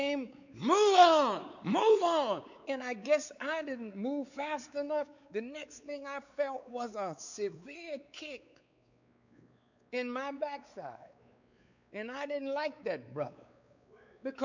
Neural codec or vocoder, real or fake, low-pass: codec, 16 kHz, 4 kbps, X-Codec, WavLM features, trained on Multilingual LibriSpeech; fake; 7.2 kHz